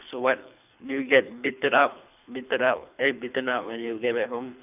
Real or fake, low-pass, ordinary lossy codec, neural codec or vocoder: fake; 3.6 kHz; none; codec, 24 kHz, 3 kbps, HILCodec